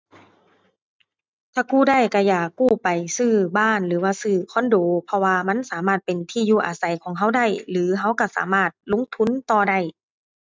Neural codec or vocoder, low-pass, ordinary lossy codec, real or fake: none; none; none; real